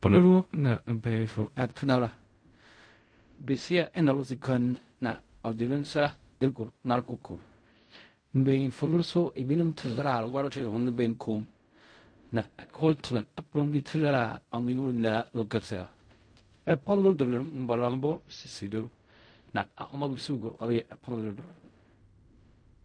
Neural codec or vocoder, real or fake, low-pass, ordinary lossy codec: codec, 16 kHz in and 24 kHz out, 0.4 kbps, LongCat-Audio-Codec, fine tuned four codebook decoder; fake; 9.9 kHz; MP3, 48 kbps